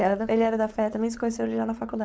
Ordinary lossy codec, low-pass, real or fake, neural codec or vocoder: none; none; fake; codec, 16 kHz, 4.8 kbps, FACodec